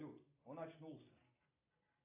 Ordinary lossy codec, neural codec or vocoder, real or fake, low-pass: AAC, 32 kbps; none; real; 3.6 kHz